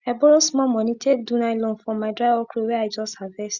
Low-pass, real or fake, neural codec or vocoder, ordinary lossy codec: 7.2 kHz; real; none; Opus, 64 kbps